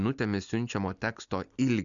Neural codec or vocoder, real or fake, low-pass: none; real; 7.2 kHz